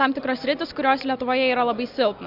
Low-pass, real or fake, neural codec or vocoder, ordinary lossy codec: 5.4 kHz; real; none; AAC, 48 kbps